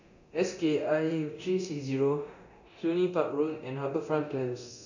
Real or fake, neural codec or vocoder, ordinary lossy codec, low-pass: fake; codec, 24 kHz, 0.9 kbps, DualCodec; none; 7.2 kHz